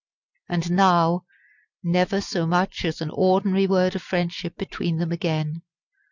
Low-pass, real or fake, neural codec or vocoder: 7.2 kHz; real; none